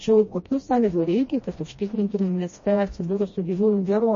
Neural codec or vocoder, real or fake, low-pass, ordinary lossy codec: codec, 16 kHz, 1 kbps, FreqCodec, smaller model; fake; 7.2 kHz; MP3, 32 kbps